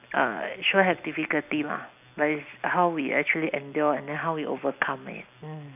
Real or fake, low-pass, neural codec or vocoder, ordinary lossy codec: real; 3.6 kHz; none; none